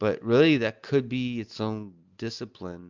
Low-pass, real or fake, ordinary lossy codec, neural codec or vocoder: 7.2 kHz; real; MP3, 64 kbps; none